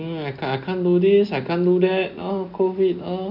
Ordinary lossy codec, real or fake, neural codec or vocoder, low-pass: none; real; none; 5.4 kHz